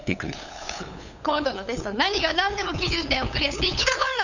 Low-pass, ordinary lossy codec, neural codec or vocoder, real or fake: 7.2 kHz; none; codec, 16 kHz, 8 kbps, FunCodec, trained on LibriTTS, 25 frames a second; fake